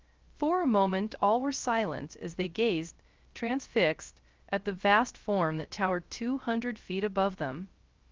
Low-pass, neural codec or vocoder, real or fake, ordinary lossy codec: 7.2 kHz; codec, 16 kHz, 0.3 kbps, FocalCodec; fake; Opus, 32 kbps